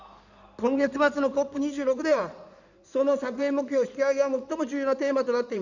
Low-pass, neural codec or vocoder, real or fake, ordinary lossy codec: 7.2 kHz; codec, 16 kHz in and 24 kHz out, 2.2 kbps, FireRedTTS-2 codec; fake; none